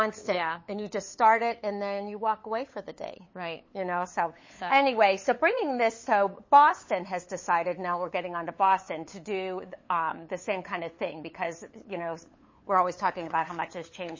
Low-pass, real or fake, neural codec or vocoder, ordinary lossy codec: 7.2 kHz; fake; codec, 16 kHz, 8 kbps, FunCodec, trained on LibriTTS, 25 frames a second; MP3, 32 kbps